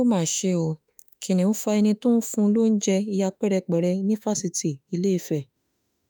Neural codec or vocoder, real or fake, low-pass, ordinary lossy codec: autoencoder, 48 kHz, 32 numbers a frame, DAC-VAE, trained on Japanese speech; fake; none; none